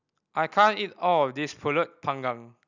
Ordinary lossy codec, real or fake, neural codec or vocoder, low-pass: none; real; none; 7.2 kHz